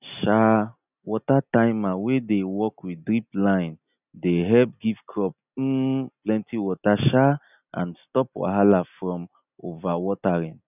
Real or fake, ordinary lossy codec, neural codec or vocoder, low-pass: real; none; none; 3.6 kHz